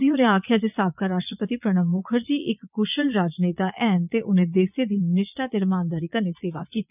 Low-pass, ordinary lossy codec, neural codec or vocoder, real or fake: 3.6 kHz; none; vocoder, 22.05 kHz, 80 mel bands, Vocos; fake